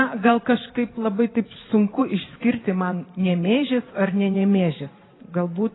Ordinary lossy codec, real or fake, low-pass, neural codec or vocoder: AAC, 16 kbps; fake; 7.2 kHz; vocoder, 44.1 kHz, 128 mel bands every 512 samples, BigVGAN v2